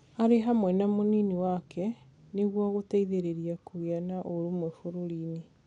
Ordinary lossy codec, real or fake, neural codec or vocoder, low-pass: none; real; none; 9.9 kHz